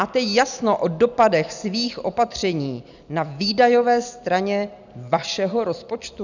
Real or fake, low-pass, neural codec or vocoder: real; 7.2 kHz; none